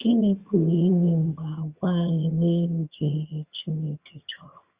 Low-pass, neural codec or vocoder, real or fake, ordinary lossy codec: 3.6 kHz; vocoder, 22.05 kHz, 80 mel bands, HiFi-GAN; fake; Opus, 64 kbps